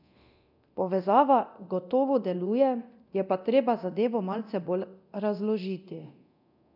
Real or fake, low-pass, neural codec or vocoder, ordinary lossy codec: fake; 5.4 kHz; codec, 24 kHz, 0.9 kbps, DualCodec; none